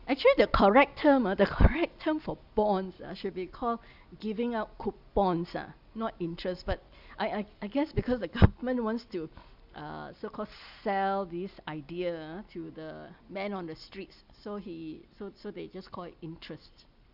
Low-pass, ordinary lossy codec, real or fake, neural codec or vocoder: 5.4 kHz; none; real; none